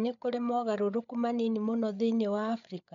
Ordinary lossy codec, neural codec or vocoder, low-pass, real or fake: none; codec, 16 kHz, 8 kbps, FreqCodec, larger model; 7.2 kHz; fake